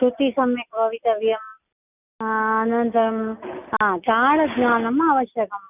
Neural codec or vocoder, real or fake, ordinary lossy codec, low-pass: none; real; none; 3.6 kHz